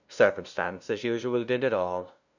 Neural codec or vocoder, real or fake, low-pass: codec, 16 kHz, 0.5 kbps, FunCodec, trained on LibriTTS, 25 frames a second; fake; 7.2 kHz